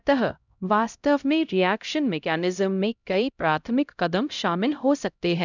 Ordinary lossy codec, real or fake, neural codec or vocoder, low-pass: none; fake; codec, 16 kHz, 0.5 kbps, X-Codec, HuBERT features, trained on LibriSpeech; 7.2 kHz